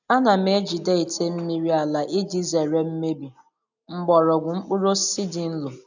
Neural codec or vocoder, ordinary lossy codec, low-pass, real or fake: none; none; 7.2 kHz; real